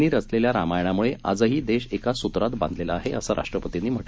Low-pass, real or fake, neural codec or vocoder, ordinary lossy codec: none; real; none; none